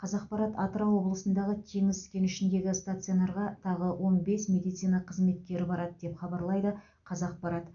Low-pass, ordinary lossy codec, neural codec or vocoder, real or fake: 7.2 kHz; none; none; real